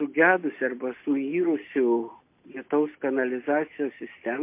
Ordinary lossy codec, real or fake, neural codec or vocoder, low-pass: MP3, 24 kbps; real; none; 3.6 kHz